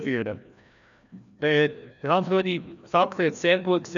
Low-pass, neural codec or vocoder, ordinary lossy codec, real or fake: 7.2 kHz; codec, 16 kHz, 1 kbps, FreqCodec, larger model; none; fake